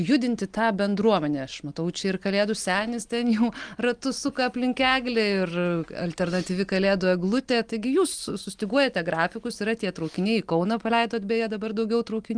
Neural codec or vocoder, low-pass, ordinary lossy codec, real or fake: none; 9.9 kHz; Opus, 24 kbps; real